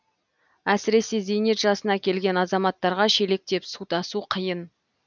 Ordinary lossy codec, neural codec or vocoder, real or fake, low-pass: none; none; real; 7.2 kHz